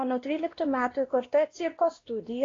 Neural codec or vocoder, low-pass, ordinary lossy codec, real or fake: codec, 16 kHz, 1 kbps, X-Codec, WavLM features, trained on Multilingual LibriSpeech; 7.2 kHz; AAC, 32 kbps; fake